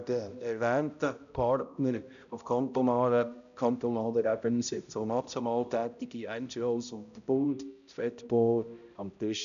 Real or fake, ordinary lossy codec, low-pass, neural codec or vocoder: fake; none; 7.2 kHz; codec, 16 kHz, 0.5 kbps, X-Codec, HuBERT features, trained on balanced general audio